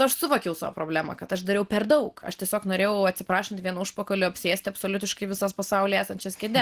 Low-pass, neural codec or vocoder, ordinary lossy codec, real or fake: 14.4 kHz; none; Opus, 16 kbps; real